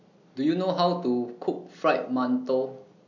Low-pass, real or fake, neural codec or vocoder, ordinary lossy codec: 7.2 kHz; real; none; none